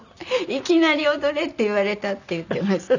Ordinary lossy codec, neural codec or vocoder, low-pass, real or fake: none; none; 7.2 kHz; real